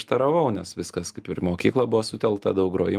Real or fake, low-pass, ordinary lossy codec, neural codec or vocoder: real; 14.4 kHz; Opus, 32 kbps; none